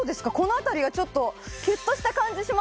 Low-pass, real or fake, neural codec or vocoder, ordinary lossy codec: none; real; none; none